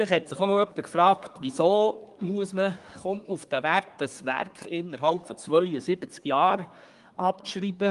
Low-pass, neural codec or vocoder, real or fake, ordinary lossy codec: 10.8 kHz; codec, 24 kHz, 1 kbps, SNAC; fake; Opus, 32 kbps